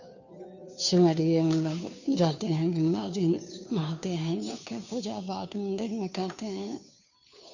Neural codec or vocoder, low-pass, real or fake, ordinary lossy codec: codec, 16 kHz, 2 kbps, FunCodec, trained on Chinese and English, 25 frames a second; 7.2 kHz; fake; none